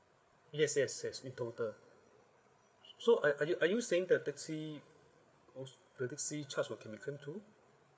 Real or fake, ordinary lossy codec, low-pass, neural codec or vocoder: fake; none; none; codec, 16 kHz, 8 kbps, FreqCodec, larger model